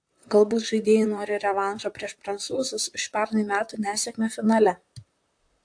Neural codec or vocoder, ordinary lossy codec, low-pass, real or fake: vocoder, 44.1 kHz, 128 mel bands, Pupu-Vocoder; AAC, 64 kbps; 9.9 kHz; fake